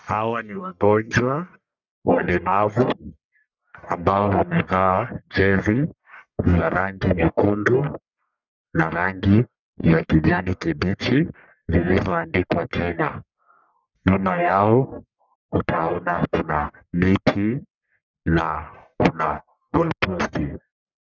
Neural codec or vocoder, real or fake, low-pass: codec, 44.1 kHz, 1.7 kbps, Pupu-Codec; fake; 7.2 kHz